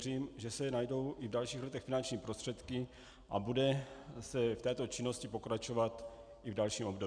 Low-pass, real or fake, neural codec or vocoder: 9.9 kHz; real; none